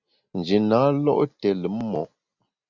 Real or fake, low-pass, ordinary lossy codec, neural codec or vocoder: real; 7.2 kHz; Opus, 64 kbps; none